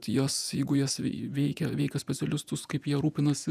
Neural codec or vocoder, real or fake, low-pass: vocoder, 48 kHz, 128 mel bands, Vocos; fake; 14.4 kHz